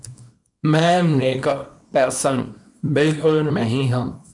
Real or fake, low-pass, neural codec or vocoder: fake; 10.8 kHz; codec, 24 kHz, 0.9 kbps, WavTokenizer, small release